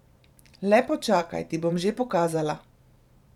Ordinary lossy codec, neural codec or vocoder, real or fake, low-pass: none; vocoder, 44.1 kHz, 128 mel bands every 512 samples, BigVGAN v2; fake; 19.8 kHz